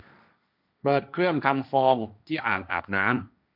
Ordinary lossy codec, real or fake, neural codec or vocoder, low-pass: none; fake; codec, 16 kHz, 1.1 kbps, Voila-Tokenizer; 5.4 kHz